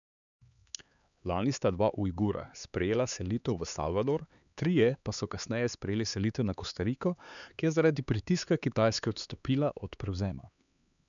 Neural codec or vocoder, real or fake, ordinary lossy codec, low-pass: codec, 16 kHz, 4 kbps, X-Codec, HuBERT features, trained on LibriSpeech; fake; none; 7.2 kHz